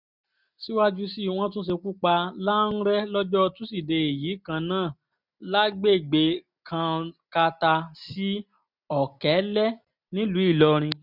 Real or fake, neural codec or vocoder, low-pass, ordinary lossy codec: real; none; 5.4 kHz; none